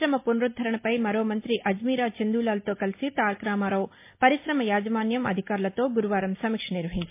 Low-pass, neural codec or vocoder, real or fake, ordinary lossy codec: 3.6 kHz; none; real; MP3, 24 kbps